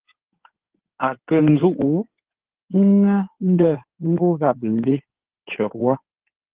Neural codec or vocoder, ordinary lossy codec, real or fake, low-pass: codec, 16 kHz in and 24 kHz out, 2.2 kbps, FireRedTTS-2 codec; Opus, 16 kbps; fake; 3.6 kHz